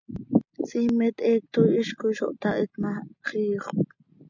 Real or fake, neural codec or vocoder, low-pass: real; none; 7.2 kHz